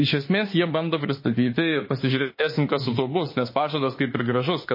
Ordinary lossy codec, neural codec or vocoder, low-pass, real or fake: MP3, 24 kbps; autoencoder, 48 kHz, 32 numbers a frame, DAC-VAE, trained on Japanese speech; 5.4 kHz; fake